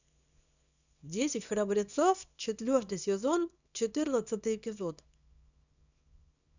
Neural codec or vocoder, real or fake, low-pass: codec, 24 kHz, 0.9 kbps, WavTokenizer, small release; fake; 7.2 kHz